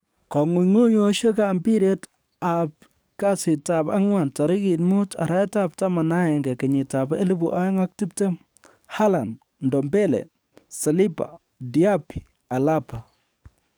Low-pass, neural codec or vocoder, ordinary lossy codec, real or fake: none; codec, 44.1 kHz, 7.8 kbps, DAC; none; fake